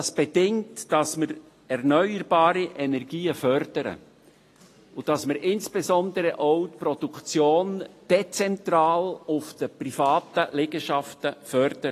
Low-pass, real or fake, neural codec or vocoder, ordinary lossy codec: 14.4 kHz; real; none; AAC, 48 kbps